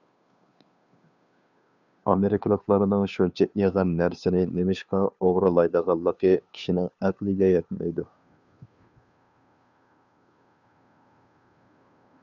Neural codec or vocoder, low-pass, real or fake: codec, 16 kHz, 2 kbps, FunCodec, trained on Chinese and English, 25 frames a second; 7.2 kHz; fake